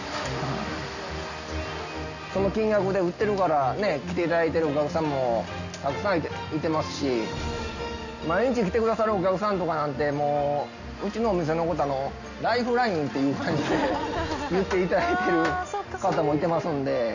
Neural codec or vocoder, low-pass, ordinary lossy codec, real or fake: none; 7.2 kHz; none; real